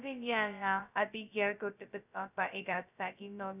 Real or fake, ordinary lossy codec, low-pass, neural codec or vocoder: fake; none; 3.6 kHz; codec, 16 kHz, 0.2 kbps, FocalCodec